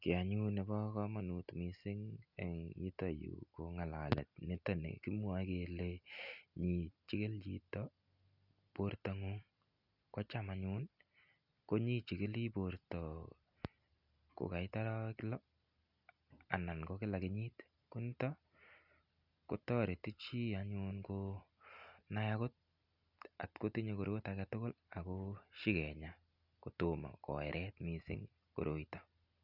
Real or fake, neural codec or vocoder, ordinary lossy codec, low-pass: real; none; none; 5.4 kHz